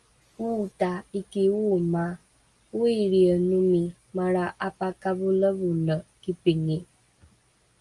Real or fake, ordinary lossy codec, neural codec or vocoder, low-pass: real; Opus, 32 kbps; none; 10.8 kHz